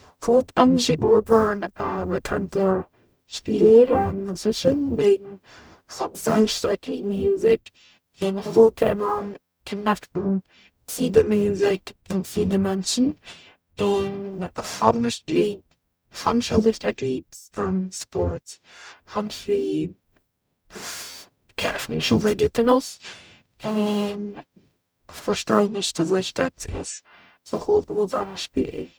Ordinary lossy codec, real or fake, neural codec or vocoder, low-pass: none; fake; codec, 44.1 kHz, 0.9 kbps, DAC; none